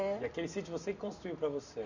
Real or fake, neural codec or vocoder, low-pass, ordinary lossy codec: real; none; 7.2 kHz; none